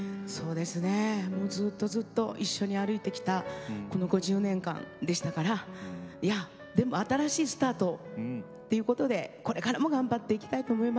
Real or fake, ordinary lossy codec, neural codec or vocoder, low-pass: real; none; none; none